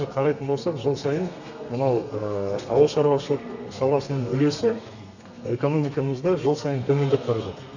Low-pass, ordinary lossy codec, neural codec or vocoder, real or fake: 7.2 kHz; none; codec, 32 kHz, 1.9 kbps, SNAC; fake